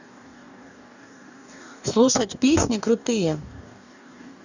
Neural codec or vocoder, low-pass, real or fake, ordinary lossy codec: codec, 44.1 kHz, 2.6 kbps, DAC; 7.2 kHz; fake; none